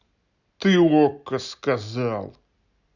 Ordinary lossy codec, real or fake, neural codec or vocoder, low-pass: none; real; none; 7.2 kHz